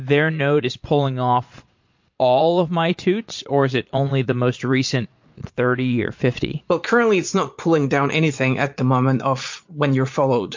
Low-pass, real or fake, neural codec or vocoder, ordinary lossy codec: 7.2 kHz; fake; vocoder, 22.05 kHz, 80 mel bands, Vocos; MP3, 48 kbps